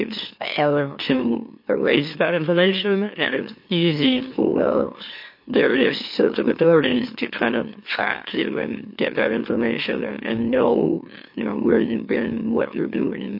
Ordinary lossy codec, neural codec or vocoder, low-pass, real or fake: MP3, 32 kbps; autoencoder, 44.1 kHz, a latent of 192 numbers a frame, MeloTTS; 5.4 kHz; fake